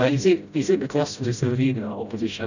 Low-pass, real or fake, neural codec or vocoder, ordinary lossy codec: 7.2 kHz; fake; codec, 16 kHz, 0.5 kbps, FreqCodec, smaller model; none